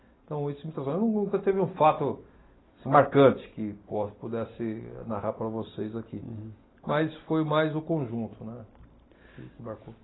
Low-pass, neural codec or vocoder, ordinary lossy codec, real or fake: 7.2 kHz; none; AAC, 16 kbps; real